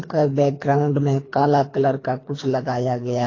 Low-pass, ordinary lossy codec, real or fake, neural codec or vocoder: 7.2 kHz; AAC, 32 kbps; fake; codec, 24 kHz, 3 kbps, HILCodec